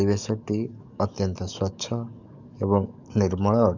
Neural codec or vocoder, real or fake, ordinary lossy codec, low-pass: codec, 44.1 kHz, 7.8 kbps, DAC; fake; none; 7.2 kHz